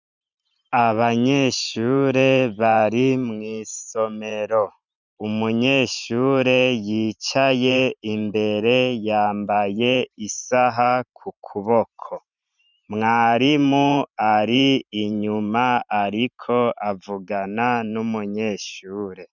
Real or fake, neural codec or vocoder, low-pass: fake; vocoder, 44.1 kHz, 128 mel bands every 512 samples, BigVGAN v2; 7.2 kHz